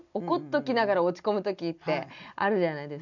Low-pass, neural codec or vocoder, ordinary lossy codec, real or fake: 7.2 kHz; none; none; real